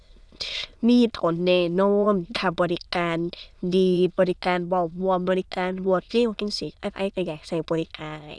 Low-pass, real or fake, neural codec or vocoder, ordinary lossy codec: none; fake; autoencoder, 22.05 kHz, a latent of 192 numbers a frame, VITS, trained on many speakers; none